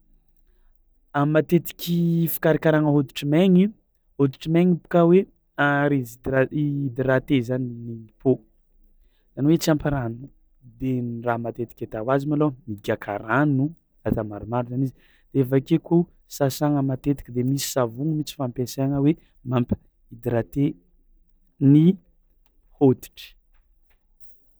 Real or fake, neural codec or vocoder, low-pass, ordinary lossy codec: real; none; none; none